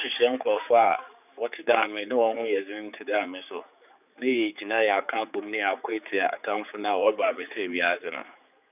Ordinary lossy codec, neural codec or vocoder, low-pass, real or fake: none; codec, 16 kHz, 4 kbps, X-Codec, HuBERT features, trained on general audio; 3.6 kHz; fake